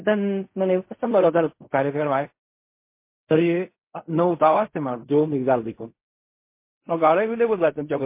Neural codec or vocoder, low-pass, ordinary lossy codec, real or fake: codec, 16 kHz in and 24 kHz out, 0.4 kbps, LongCat-Audio-Codec, fine tuned four codebook decoder; 3.6 kHz; MP3, 24 kbps; fake